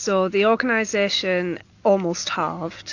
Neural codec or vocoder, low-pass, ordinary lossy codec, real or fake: none; 7.2 kHz; AAC, 48 kbps; real